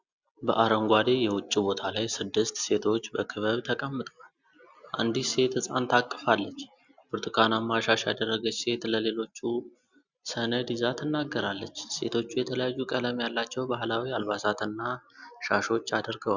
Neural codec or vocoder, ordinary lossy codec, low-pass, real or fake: none; Opus, 64 kbps; 7.2 kHz; real